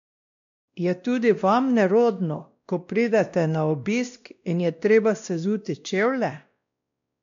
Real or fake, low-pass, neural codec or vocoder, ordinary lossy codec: fake; 7.2 kHz; codec, 16 kHz, 1 kbps, X-Codec, WavLM features, trained on Multilingual LibriSpeech; MP3, 64 kbps